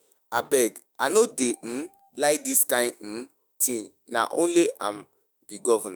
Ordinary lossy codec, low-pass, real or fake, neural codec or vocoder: none; none; fake; autoencoder, 48 kHz, 32 numbers a frame, DAC-VAE, trained on Japanese speech